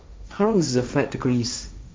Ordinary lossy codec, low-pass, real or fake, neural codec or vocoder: none; none; fake; codec, 16 kHz, 1.1 kbps, Voila-Tokenizer